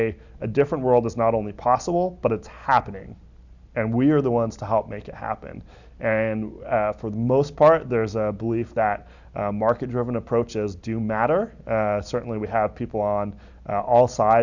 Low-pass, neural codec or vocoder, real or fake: 7.2 kHz; none; real